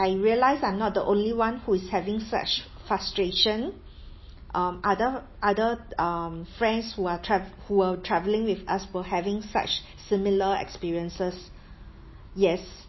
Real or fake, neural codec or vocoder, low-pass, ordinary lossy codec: real; none; 7.2 kHz; MP3, 24 kbps